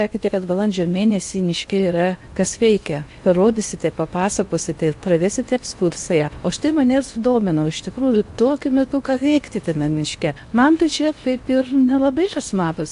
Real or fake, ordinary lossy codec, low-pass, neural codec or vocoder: fake; AAC, 64 kbps; 10.8 kHz; codec, 16 kHz in and 24 kHz out, 0.8 kbps, FocalCodec, streaming, 65536 codes